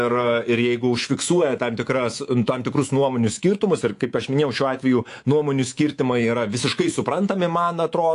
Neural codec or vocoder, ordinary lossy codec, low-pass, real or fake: codec, 24 kHz, 3.1 kbps, DualCodec; AAC, 48 kbps; 10.8 kHz; fake